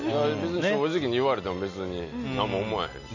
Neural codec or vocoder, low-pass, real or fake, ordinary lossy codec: none; 7.2 kHz; real; none